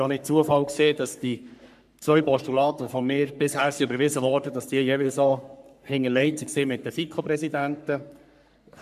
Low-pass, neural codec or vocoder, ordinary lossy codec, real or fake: 14.4 kHz; codec, 44.1 kHz, 3.4 kbps, Pupu-Codec; none; fake